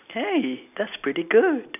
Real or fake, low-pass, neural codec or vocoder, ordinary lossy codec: real; 3.6 kHz; none; none